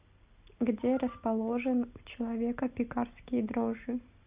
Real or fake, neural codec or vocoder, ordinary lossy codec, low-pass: real; none; Opus, 64 kbps; 3.6 kHz